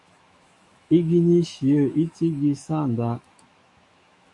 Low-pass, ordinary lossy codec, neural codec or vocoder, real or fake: 10.8 kHz; MP3, 48 kbps; autoencoder, 48 kHz, 128 numbers a frame, DAC-VAE, trained on Japanese speech; fake